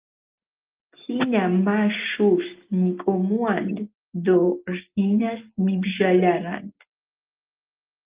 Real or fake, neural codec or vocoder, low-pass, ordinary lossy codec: real; none; 3.6 kHz; Opus, 32 kbps